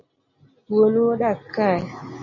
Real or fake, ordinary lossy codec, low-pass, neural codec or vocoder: real; MP3, 32 kbps; 7.2 kHz; none